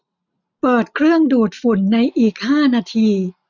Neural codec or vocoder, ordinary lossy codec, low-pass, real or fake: none; none; 7.2 kHz; real